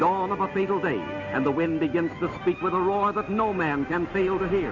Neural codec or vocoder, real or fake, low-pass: none; real; 7.2 kHz